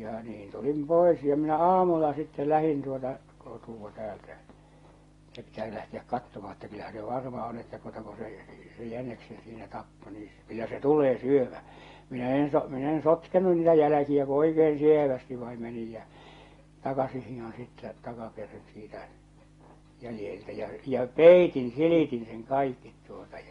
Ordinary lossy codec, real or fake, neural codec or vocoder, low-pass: AAC, 32 kbps; real; none; 10.8 kHz